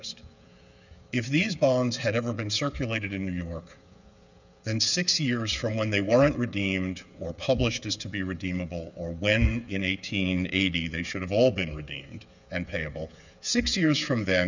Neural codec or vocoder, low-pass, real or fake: codec, 16 kHz, 8 kbps, FreqCodec, smaller model; 7.2 kHz; fake